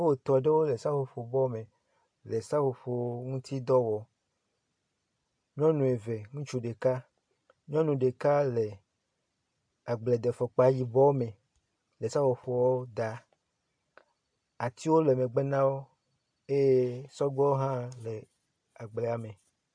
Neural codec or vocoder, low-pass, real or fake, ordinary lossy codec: none; 9.9 kHz; real; AAC, 64 kbps